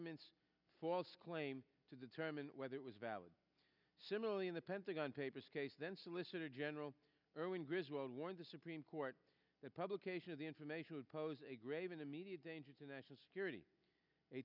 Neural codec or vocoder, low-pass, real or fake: none; 5.4 kHz; real